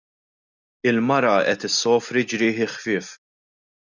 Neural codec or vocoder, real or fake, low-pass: none; real; 7.2 kHz